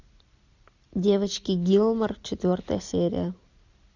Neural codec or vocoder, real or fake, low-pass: none; real; 7.2 kHz